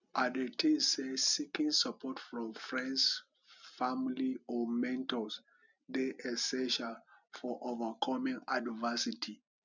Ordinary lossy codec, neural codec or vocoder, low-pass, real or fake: none; none; 7.2 kHz; real